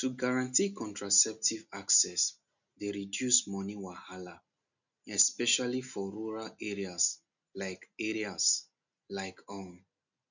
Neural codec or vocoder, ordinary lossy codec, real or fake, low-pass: none; none; real; 7.2 kHz